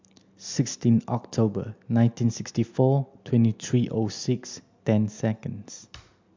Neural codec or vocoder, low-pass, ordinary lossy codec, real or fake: none; 7.2 kHz; MP3, 64 kbps; real